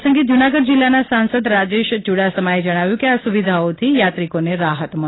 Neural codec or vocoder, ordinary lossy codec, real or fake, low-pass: none; AAC, 16 kbps; real; 7.2 kHz